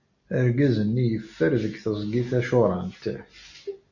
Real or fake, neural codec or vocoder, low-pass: real; none; 7.2 kHz